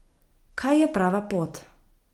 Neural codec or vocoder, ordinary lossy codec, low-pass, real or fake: none; Opus, 24 kbps; 19.8 kHz; real